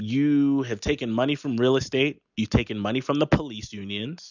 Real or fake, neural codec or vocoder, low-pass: real; none; 7.2 kHz